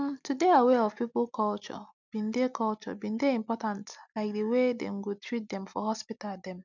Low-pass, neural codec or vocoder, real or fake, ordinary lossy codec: 7.2 kHz; none; real; none